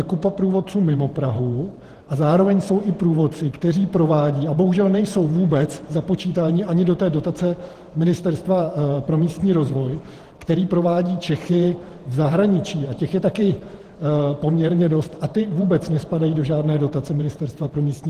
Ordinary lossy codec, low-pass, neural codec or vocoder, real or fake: Opus, 16 kbps; 14.4 kHz; vocoder, 48 kHz, 128 mel bands, Vocos; fake